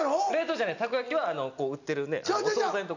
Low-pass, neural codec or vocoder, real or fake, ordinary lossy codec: 7.2 kHz; none; real; none